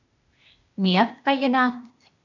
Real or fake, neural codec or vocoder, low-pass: fake; codec, 16 kHz, 0.8 kbps, ZipCodec; 7.2 kHz